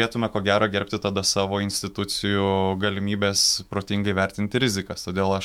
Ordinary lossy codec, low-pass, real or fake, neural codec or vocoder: MP3, 96 kbps; 19.8 kHz; fake; autoencoder, 48 kHz, 128 numbers a frame, DAC-VAE, trained on Japanese speech